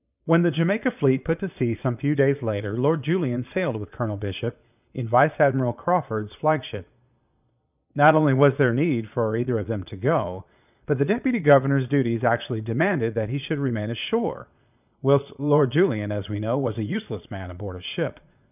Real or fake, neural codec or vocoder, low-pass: fake; vocoder, 22.05 kHz, 80 mel bands, Vocos; 3.6 kHz